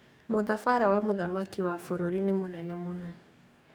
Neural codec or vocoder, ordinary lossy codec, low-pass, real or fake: codec, 44.1 kHz, 2.6 kbps, DAC; none; none; fake